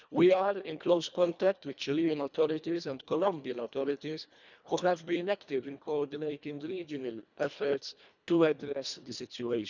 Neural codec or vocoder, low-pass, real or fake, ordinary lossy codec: codec, 24 kHz, 1.5 kbps, HILCodec; 7.2 kHz; fake; none